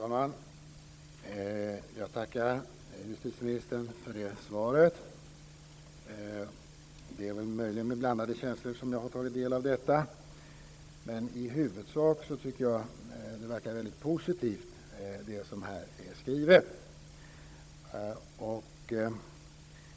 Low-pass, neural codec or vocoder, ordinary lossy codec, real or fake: none; codec, 16 kHz, 16 kbps, FunCodec, trained on Chinese and English, 50 frames a second; none; fake